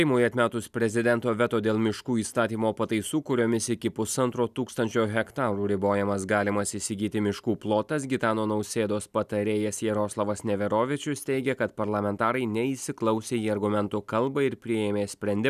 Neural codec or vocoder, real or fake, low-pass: none; real; 14.4 kHz